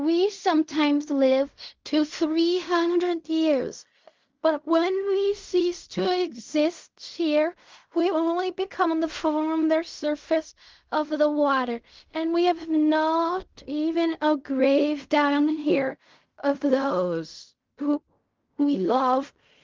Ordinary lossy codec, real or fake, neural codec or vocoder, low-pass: Opus, 32 kbps; fake; codec, 16 kHz in and 24 kHz out, 0.4 kbps, LongCat-Audio-Codec, fine tuned four codebook decoder; 7.2 kHz